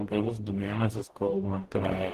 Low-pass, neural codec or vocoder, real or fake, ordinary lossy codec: 14.4 kHz; codec, 44.1 kHz, 0.9 kbps, DAC; fake; Opus, 16 kbps